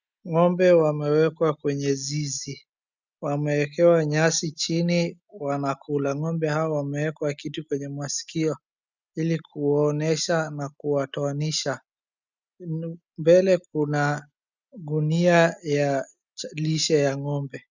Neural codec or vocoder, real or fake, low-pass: none; real; 7.2 kHz